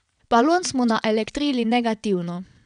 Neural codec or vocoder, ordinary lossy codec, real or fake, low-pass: vocoder, 22.05 kHz, 80 mel bands, WaveNeXt; none; fake; 9.9 kHz